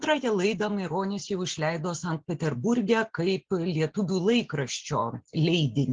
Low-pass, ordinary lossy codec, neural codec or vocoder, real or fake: 7.2 kHz; Opus, 16 kbps; none; real